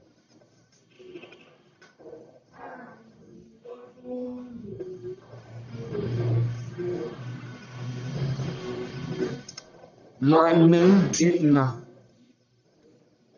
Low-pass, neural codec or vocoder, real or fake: 7.2 kHz; codec, 44.1 kHz, 1.7 kbps, Pupu-Codec; fake